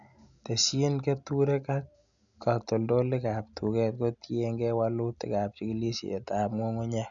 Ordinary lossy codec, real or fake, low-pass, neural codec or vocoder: none; real; 7.2 kHz; none